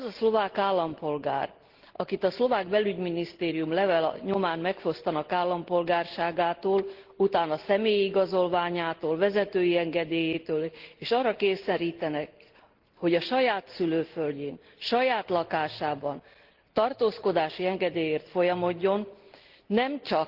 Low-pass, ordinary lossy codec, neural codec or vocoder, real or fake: 5.4 kHz; Opus, 16 kbps; none; real